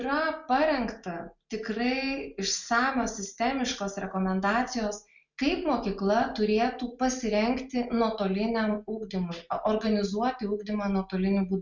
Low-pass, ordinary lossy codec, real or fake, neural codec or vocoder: 7.2 kHz; Opus, 64 kbps; real; none